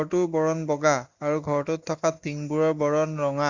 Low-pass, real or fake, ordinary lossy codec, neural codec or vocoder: 7.2 kHz; real; Opus, 64 kbps; none